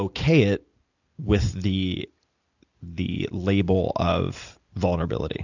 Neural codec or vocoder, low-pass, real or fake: none; 7.2 kHz; real